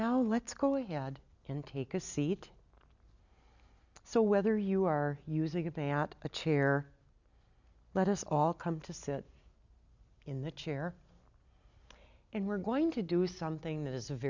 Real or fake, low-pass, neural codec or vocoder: real; 7.2 kHz; none